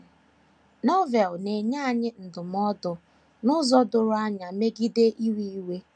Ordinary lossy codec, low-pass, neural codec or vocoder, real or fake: none; 9.9 kHz; none; real